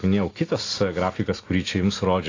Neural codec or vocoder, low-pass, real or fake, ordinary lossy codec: none; 7.2 kHz; real; AAC, 32 kbps